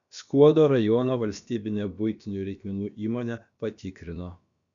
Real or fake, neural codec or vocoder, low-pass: fake; codec, 16 kHz, about 1 kbps, DyCAST, with the encoder's durations; 7.2 kHz